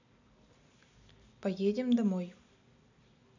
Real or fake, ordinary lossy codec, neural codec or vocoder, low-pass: real; AAC, 48 kbps; none; 7.2 kHz